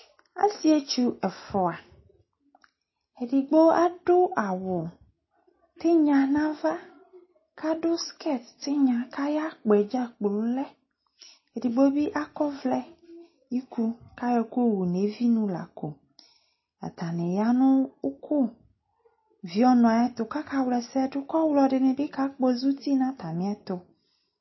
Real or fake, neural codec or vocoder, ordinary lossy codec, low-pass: real; none; MP3, 24 kbps; 7.2 kHz